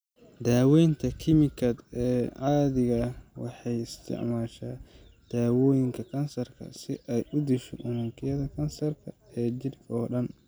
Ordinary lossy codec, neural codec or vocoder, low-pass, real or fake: none; none; none; real